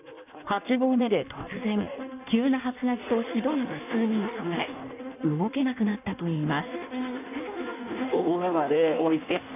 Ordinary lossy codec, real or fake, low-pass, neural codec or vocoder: none; fake; 3.6 kHz; codec, 16 kHz in and 24 kHz out, 1.1 kbps, FireRedTTS-2 codec